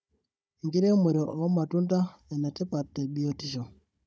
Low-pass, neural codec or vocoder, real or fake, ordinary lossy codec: none; codec, 16 kHz, 16 kbps, FunCodec, trained on Chinese and English, 50 frames a second; fake; none